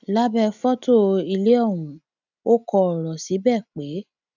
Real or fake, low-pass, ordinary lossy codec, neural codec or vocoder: real; 7.2 kHz; none; none